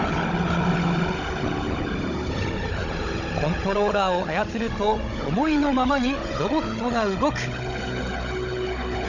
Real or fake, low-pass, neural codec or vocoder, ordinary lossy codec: fake; 7.2 kHz; codec, 16 kHz, 16 kbps, FunCodec, trained on Chinese and English, 50 frames a second; none